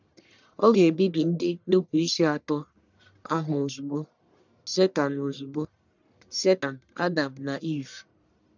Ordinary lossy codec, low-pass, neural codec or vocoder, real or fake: none; 7.2 kHz; codec, 44.1 kHz, 1.7 kbps, Pupu-Codec; fake